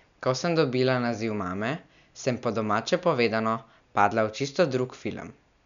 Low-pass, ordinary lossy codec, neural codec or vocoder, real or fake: 7.2 kHz; none; none; real